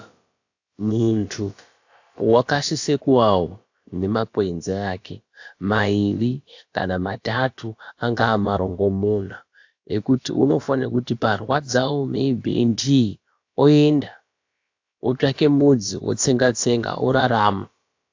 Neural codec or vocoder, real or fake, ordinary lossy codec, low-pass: codec, 16 kHz, about 1 kbps, DyCAST, with the encoder's durations; fake; AAC, 48 kbps; 7.2 kHz